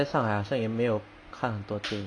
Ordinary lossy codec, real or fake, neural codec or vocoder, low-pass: Opus, 64 kbps; real; none; 9.9 kHz